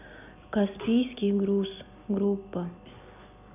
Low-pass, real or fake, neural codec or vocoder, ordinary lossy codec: 3.6 kHz; real; none; none